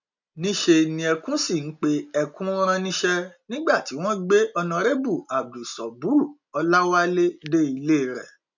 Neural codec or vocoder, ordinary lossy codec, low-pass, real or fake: none; none; 7.2 kHz; real